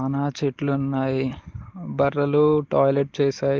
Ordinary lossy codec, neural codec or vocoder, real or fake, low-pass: Opus, 16 kbps; none; real; 7.2 kHz